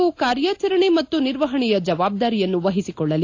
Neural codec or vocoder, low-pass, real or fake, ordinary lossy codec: none; 7.2 kHz; real; AAC, 48 kbps